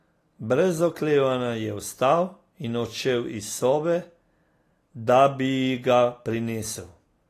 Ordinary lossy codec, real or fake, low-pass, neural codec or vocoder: AAC, 48 kbps; real; 14.4 kHz; none